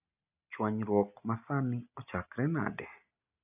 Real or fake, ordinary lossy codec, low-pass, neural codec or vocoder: real; none; 3.6 kHz; none